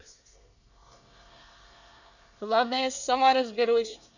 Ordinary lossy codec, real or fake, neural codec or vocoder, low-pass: none; fake; codec, 24 kHz, 1 kbps, SNAC; 7.2 kHz